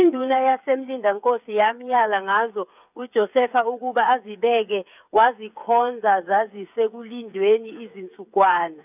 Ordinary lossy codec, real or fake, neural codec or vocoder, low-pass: none; fake; codec, 16 kHz, 8 kbps, FreqCodec, smaller model; 3.6 kHz